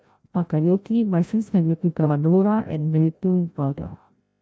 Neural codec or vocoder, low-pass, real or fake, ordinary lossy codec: codec, 16 kHz, 0.5 kbps, FreqCodec, larger model; none; fake; none